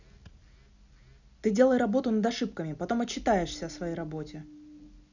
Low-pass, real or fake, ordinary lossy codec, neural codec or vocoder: 7.2 kHz; real; none; none